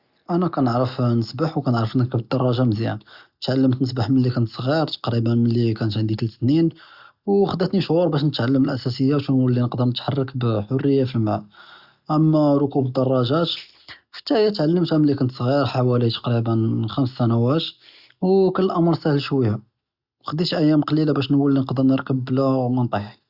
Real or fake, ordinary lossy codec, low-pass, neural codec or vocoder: real; Opus, 64 kbps; 5.4 kHz; none